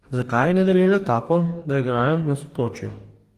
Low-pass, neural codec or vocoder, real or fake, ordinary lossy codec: 14.4 kHz; codec, 44.1 kHz, 2.6 kbps, DAC; fake; Opus, 24 kbps